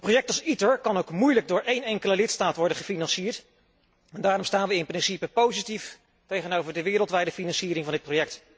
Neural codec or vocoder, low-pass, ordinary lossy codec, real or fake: none; none; none; real